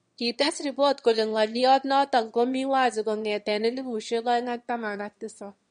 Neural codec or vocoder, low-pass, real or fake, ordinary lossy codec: autoencoder, 22.05 kHz, a latent of 192 numbers a frame, VITS, trained on one speaker; 9.9 kHz; fake; MP3, 48 kbps